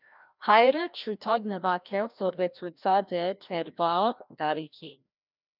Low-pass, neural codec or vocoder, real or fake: 5.4 kHz; codec, 16 kHz, 1 kbps, FreqCodec, larger model; fake